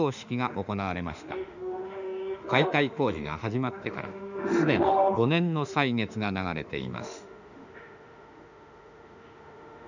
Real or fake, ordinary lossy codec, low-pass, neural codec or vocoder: fake; none; 7.2 kHz; autoencoder, 48 kHz, 32 numbers a frame, DAC-VAE, trained on Japanese speech